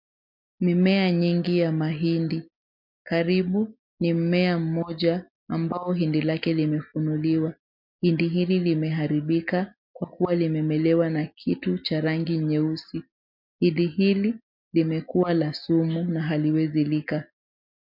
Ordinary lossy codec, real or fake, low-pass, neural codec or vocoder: MP3, 48 kbps; real; 5.4 kHz; none